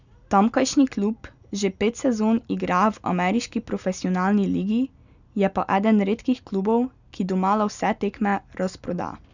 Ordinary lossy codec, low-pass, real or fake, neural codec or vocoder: none; 7.2 kHz; real; none